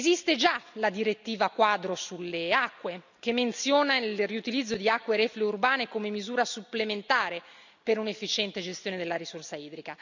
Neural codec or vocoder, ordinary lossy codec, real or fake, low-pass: none; none; real; 7.2 kHz